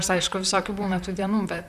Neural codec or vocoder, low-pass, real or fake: vocoder, 44.1 kHz, 128 mel bands, Pupu-Vocoder; 14.4 kHz; fake